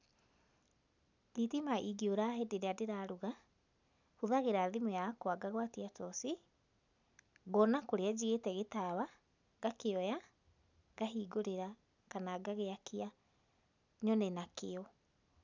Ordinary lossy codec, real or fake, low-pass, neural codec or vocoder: none; real; 7.2 kHz; none